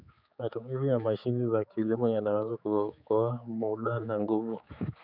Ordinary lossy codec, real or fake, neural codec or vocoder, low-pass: none; fake; codec, 16 kHz, 4 kbps, X-Codec, HuBERT features, trained on general audio; 5.4 kHz